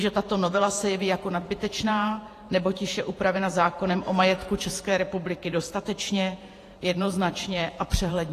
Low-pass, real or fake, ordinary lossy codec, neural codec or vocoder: 14.4 kHz; fake; AAC, 48 kbps; vocoder, 48 kHz, 128 mel bands, Vocos